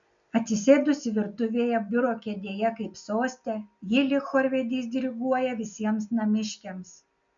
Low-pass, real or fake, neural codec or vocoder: 7.2 kHz; real; none